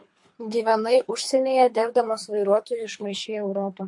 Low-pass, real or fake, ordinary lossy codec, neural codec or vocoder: 10.8 kHz; fake; MP3, 48 kbps; codec, 24 kHz, 3 kbps, HILCodec